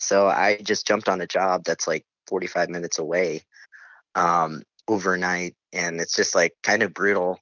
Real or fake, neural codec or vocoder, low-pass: real; none; 7.2 kHz